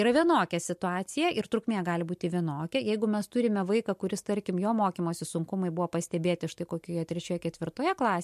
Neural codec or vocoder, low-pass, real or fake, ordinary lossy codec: none; 14.4 kHz; real; MP3, 64 kbps